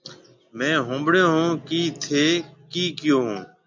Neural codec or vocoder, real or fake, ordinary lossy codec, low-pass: none; real; MP3, 64 kbps; 7.2 kHz